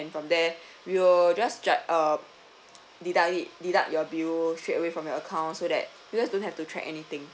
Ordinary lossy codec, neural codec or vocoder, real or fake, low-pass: none; none; real; none